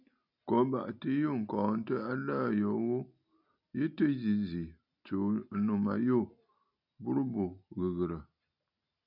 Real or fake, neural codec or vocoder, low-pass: real; none; 5.4 kHz